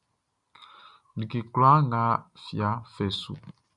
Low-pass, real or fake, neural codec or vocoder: 10.8 kHz; real; none